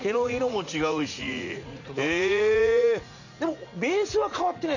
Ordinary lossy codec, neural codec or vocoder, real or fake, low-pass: none; vocoder, 44.1 kHz, 80 mel bands, Vocos; fake; 7.2 kHz